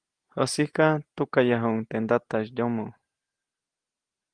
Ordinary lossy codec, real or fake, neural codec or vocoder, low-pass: Opus, 24 kbps; real; none; 9.9 kHz